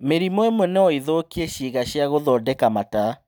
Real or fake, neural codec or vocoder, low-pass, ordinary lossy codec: real; none; none; none